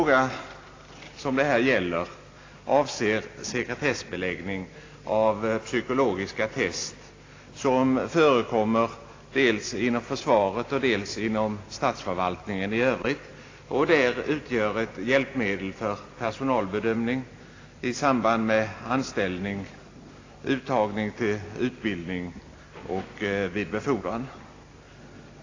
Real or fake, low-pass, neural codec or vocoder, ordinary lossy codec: real; 7.2 kHz; none; AAC, 32 kbps